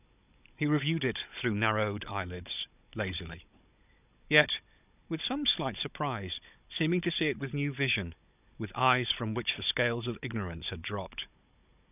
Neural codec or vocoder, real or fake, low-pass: codec, 16 kHz, 16 kbps, FunCodec, trained on Chinese and English, 50 frames a second; fake; 3.6 kHz